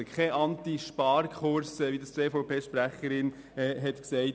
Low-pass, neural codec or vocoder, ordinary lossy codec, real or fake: none; none; none; real